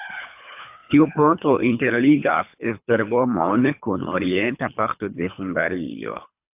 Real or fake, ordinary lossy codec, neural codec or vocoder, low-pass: fake; AAC, 32 kbps; codec, 24 kHz, 3 kbps, HILCodec; 3.6 kHz